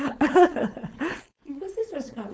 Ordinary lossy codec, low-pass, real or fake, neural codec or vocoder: none; none; fake; codec, 16 kHz, 4.8 kbps, FACodec